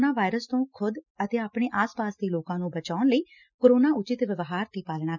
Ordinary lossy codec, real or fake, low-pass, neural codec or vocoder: none; real; 7.2 kHz; none